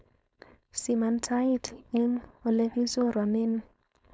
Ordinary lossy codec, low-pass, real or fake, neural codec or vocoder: none; none; fake; codec, 16 kHz, 4.8 kbps, FACodec